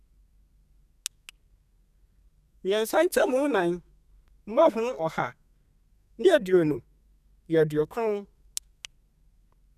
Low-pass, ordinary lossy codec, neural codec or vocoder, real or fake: 14.4 kHz; none; codec, 32 kHz, 1.9 kbps, SNAC; fake